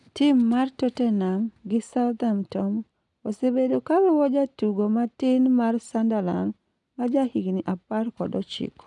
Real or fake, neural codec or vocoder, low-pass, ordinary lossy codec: real; none; 10.8 kHz; none